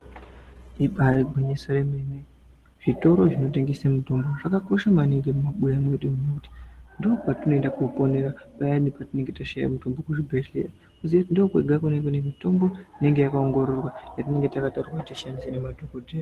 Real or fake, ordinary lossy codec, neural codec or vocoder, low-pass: real; Opus, 16 kbps; none; 14.4 kHz